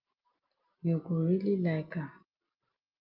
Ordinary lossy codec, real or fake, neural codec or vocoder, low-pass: Opus, 32 kbps; real; none; 5.4 kHz